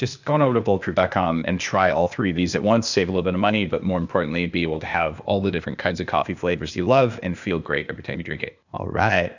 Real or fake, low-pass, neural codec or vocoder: fake; 7.2 kHz; codec, 16 kHz, 0.8 kbps, ZipCodec